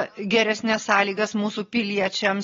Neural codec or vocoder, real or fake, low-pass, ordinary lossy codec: none; real; 7.2 kHz; AAC, 24 kbps